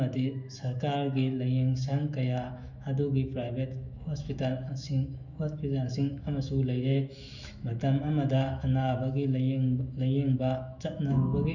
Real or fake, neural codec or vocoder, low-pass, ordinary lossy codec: fake; autoencoder, 48 kHz, 128 numbers a frame, DAC-VAE, trained on Japanese speech; 7.2 kHz; none